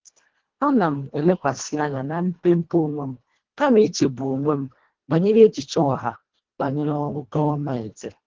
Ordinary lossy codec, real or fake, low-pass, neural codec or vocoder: Opus, 16 kbps; fake; 7.2 kHz; codec, 24 kHz, 1.5 kbps, HILCodec